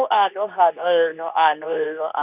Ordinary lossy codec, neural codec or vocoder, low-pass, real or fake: none; codec, 24 kHz, 0.9 kbps, WavTokenizer, medium speech release version 2; 3.6 kHz; fake